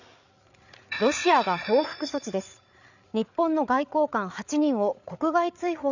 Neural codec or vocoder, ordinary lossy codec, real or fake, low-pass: codec, 16 kHz, 8 kbps, FreqCodec, larger model; none; fake; 7.2 kHz